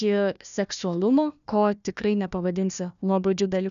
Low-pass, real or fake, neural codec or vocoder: 7.2 kHz; fake; codec, 16 kHz, 1 kbps, FunCodec, trained on Chinese and English, 50 frames a second